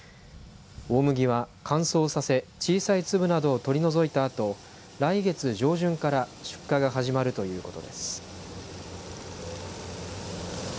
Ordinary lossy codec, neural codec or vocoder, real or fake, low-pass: none; none; real; none